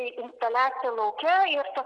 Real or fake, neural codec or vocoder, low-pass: real; none; 10.8 kHz